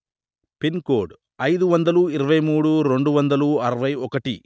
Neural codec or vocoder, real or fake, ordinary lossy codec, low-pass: none; real; none; none